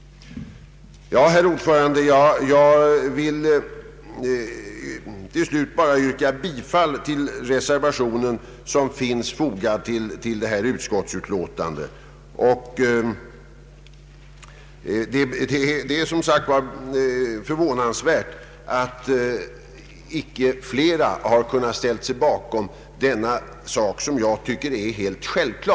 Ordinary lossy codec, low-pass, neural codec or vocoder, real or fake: none; none; none; real